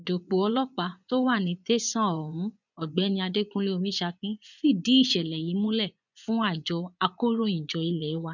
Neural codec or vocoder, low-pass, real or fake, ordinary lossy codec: vocoder, 24 kHz, 100 mel bands, Vocos; 7.2 kHz; fake; none